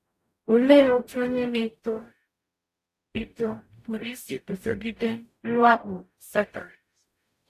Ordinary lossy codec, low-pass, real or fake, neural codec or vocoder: AAC, 64 kbps; 14.4 kHz; fake; codec, 44.1 kHz, 0.9 kbps, DAC